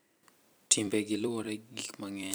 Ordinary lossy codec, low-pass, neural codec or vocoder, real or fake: none; none; vocoder, 44.1 kHz, 128 mel bands every 256 samples, BigVGAN v2; fake